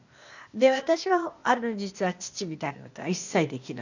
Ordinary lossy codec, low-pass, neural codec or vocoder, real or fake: none; 7.2 kHz; codec, 16 kHz, 0.8 kbps, ZipCodec; fake